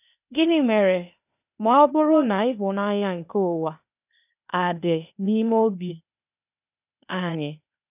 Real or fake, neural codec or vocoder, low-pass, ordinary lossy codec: fake; codec, 16 kHz, 0.8 kbps, ZipCodec; 3.6 kHz; none